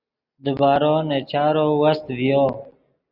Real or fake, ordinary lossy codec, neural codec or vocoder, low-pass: real; Opus, 64 kbps; none; 5.4 kHz